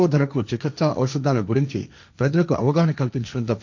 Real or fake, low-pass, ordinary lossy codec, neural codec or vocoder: fake; 7.2 kHz; none; codec, 16 kHz, 1.1 kbps, Voila-Tokenizer